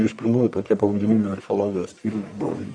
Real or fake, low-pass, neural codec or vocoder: fake; 9.9 kHz; codec, 44.1 kHz, 1.7 kbps, Pupu-Codec